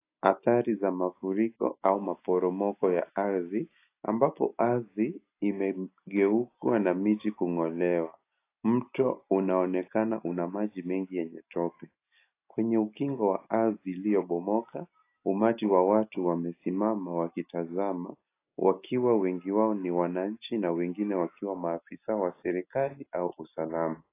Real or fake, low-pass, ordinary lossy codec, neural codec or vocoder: real; 3.6 kHz; AAC, 24 kbps; none